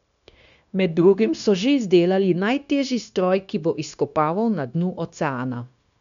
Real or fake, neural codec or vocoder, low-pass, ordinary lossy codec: fake; codec, 16 kHz, 0.9 kbps, LongCat-Audio-Codec; 7.2 kHz; none